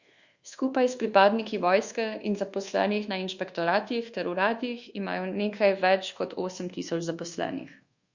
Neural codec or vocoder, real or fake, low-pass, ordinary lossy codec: codec, 24 kHz, 1.2 kbps, DualCodec; fake; 7.2 kHz; Opus, 64 kbps